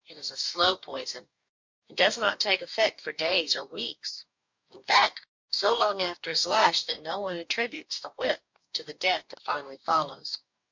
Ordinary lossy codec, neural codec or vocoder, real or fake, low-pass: MP3, 64 kbps; codec, 44.1 kHz, 2.6 kbps, DAC; fake; 7.2 kHz